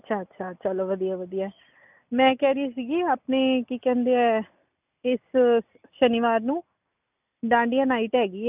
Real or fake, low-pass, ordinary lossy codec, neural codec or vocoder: real; 3.6 kHz; none; none